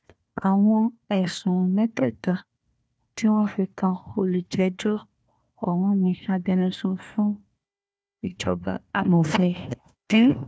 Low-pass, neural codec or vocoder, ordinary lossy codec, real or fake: none; codec, 16 kHz, 1 kbps, FunCodec, trained on Chinese and English, 50 frames a second; none; fake